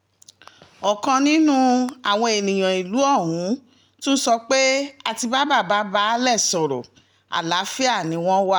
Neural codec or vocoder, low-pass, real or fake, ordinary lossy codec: none; none; real; none